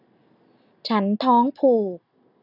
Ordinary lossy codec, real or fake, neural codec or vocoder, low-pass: none; real; none; 5.4 kHz